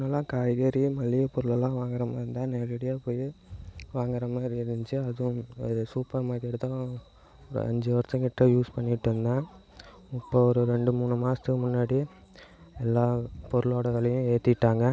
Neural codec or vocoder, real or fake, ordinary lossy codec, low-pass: none; real; none; none